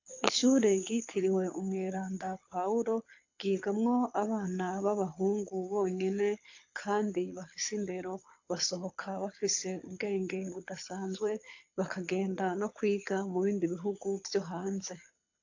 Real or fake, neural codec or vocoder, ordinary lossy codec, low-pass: fake; codec, 24 kHz, 6 kbps, HILCodec; AAC, 48 kbps; 7.2 kHz